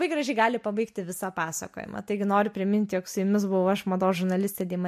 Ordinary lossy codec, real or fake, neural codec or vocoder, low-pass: MP3, 64 kbps; real; none; 14.4 kHz